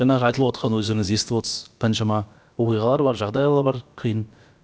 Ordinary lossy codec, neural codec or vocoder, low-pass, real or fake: none; codec, 16 kHz, about 1 kbps, DyCAST, with the encoder's durations; none; fake